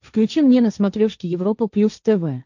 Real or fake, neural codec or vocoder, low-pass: fake; codec, 16 kHz, 1.1 kbps, Voila-Tokenizer; 7.2 kHz